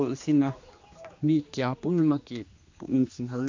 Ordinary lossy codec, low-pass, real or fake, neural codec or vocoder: MP3, 48 kbps; 7.2 kHz; fake; codec, 16 kHz, 2 kbps, X-Codec, HuBERT features, trained on general audio